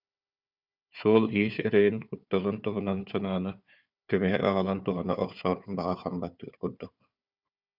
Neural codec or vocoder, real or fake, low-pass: codec, 16 kHz, 4 kbps, FunCodec, trained on Chinese and English, 50 frames a second; fake; 5.4 kHz